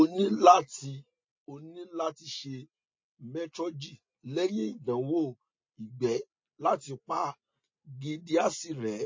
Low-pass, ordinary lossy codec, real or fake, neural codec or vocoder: 7.2 kHz; MP3, 32 kbps; real; none